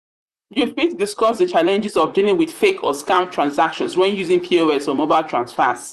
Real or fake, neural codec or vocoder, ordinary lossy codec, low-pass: fake; vocoder, 44.1 kHz, 128 mel bands, Pupu-Vocoder; Opus, 64 kbps; 14.4 kHz